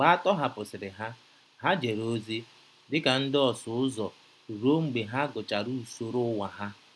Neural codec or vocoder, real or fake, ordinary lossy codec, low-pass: none; real; none; none